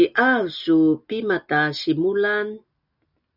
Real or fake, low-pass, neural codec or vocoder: real; 5.4 kHz; none